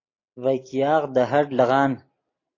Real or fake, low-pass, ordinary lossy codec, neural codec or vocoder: real; 7.2 kHz; AAC, 32 kbps; none